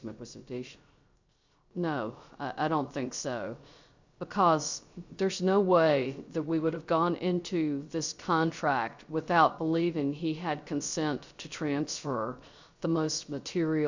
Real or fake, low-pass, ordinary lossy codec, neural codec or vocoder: fake; 7.2 kHz; Opus, 64 kbps; codec, 16 kHz, 0.3 kbps, FocalCodec